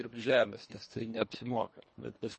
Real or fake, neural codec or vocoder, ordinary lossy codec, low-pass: fake; codec, 24 kHz, 1.5 kbps, HILCodec; MP3, 32 kbps; 10.8 kHz